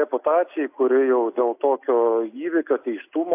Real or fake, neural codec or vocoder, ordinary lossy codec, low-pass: real; none; AAC, 32 kbps; 3.6 kHz